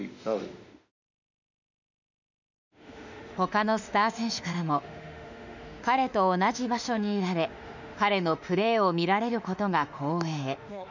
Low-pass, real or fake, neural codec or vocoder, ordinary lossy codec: 7.2 kHz; fake; autoencoder, 48 kHz, 32 numbers a frame, DAC-VAE, trained on Japanese speech; none